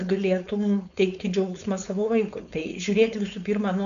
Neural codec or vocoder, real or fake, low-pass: codec, 16 kHz, 4.8 kbps, FACodec; fake; 7.2 kHz